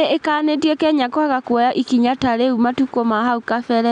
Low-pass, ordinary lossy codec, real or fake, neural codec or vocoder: 9.9 kHz; none; real; none